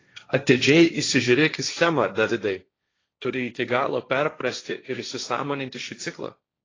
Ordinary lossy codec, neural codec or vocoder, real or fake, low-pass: AAC, 32 kbps; codec, 16 kHz, 1.1 kbps, Voila-Tokenizer; fake; 7.2 kHz